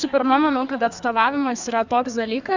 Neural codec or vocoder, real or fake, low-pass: codec, 32 kHz, 1.9 kbps, SNAC; fake; 7.2 kHz